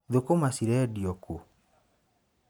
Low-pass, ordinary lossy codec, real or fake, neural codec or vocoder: none; none; real; none